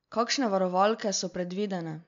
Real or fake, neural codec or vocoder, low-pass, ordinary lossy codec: real; none; 7.2 kHz; MP3, 64 kbps